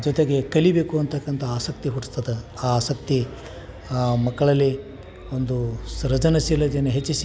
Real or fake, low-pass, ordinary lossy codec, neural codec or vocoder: real; none; none; none